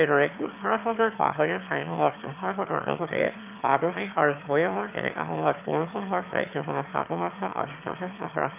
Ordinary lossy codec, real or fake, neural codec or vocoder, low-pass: none; fake; autoencoder, 22.05 kHz, a latent of 192 numbers a frame, VITS, trained on one speaker; 3.6 kHz